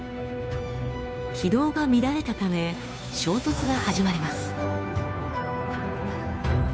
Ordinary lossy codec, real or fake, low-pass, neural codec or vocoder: none; fake; none; codec, 16 kHz, 2 kbps, FunCodec, trained on Chinese and English, 25 frames a second